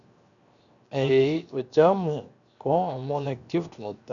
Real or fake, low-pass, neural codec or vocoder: fake; 7.2 kHz; codec, 16 kHz, 0.7 kbps, FocalCodec